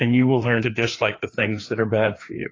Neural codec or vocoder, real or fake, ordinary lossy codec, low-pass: codec, 16 kHz, 2 kbps, FreqCodec, larger model; fake; AAC, 32 kbps; 7.2 kHz